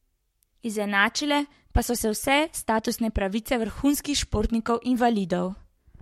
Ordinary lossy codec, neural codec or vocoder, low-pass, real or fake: MP3, 64 kbps; codec, 44.1 kHz, 7.8 kbps, Pupu-Codec; 19.8 kHz; fake